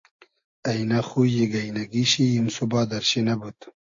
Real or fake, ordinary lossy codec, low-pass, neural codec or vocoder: real; AAC, 48 kbps; 7.2 kHz; none